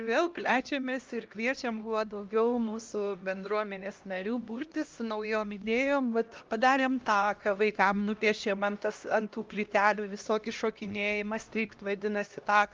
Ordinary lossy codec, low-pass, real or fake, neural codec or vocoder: Opus, 32 kbps; 7.2 kHz; fake; codec, 16 kHz, 1 kbps, X-Codec, HuBERT features, trained on LibriSpeech